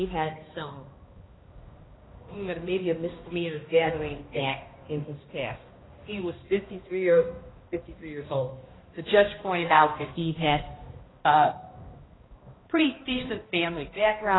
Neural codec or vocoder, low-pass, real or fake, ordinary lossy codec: codec, 16 kHz, 1 kbps, X-Codec, HuBERT features, trained on balanced general audio; 7.2 kHz; fake; AAC, 16 kbps